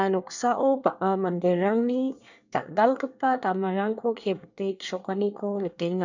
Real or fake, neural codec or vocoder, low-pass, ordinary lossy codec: fake; codec, 24 kHz, 1 kbps, SNAC; 7.2 kHz; none